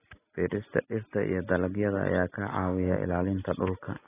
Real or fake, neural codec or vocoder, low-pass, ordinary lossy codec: real; none; 3.6 kHz; AAC, 16 kbps